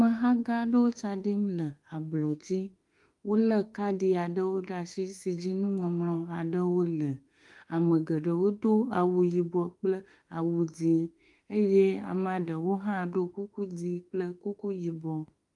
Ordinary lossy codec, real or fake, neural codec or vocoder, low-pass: Opus, 32 kbps; fake; autoencoder, 48 kHz, 32 numbers a frame, DAC-VAE, trained on Japanese speech; 10.8 kHz